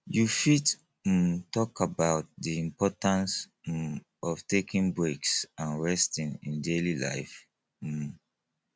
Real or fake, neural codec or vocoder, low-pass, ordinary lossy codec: real; none; none; none